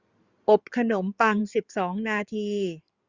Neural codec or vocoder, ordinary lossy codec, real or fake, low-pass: codec, 16 kHz in and 24 kHz out, 2.2 kbps, FireRedTTS-2 codec; Opus, 64 kbps; fake; 7.2 kHz